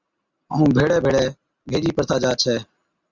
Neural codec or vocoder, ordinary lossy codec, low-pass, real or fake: none; Opus, 64 kbps; 7.2 kHz; real